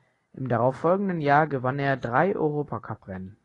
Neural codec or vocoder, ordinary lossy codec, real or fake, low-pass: none; AAC, 32 kbps; real; 10.8 kHz